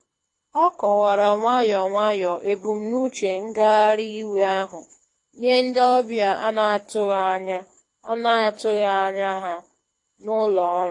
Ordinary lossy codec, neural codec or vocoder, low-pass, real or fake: AAC, 48 kbps; codec, 24 kHz, 3 kbps, HILCodec; 10.8 kHz; fake